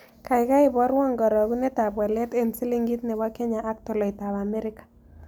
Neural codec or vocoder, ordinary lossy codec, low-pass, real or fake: none; none; none; real